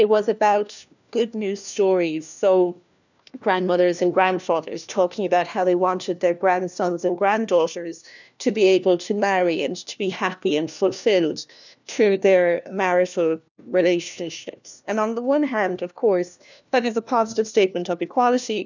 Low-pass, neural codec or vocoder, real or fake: 7.2 kHz; codec, 16 kHz, 1 kbps, FunCodec, trained on LibriTTS, 50 frames a second; fake